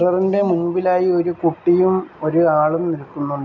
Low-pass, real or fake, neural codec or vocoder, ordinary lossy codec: 7.2 kHz; real; none; none